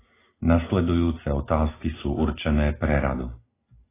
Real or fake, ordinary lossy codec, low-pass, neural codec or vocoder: real; AAC, 16 kbps; 3.6 kHz; none